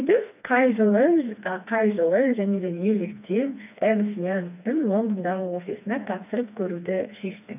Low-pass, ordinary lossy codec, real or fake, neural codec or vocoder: 3.6 kHz; none; fake; codec, 16 kHz, 2 kbps, FreqCodec, smaller model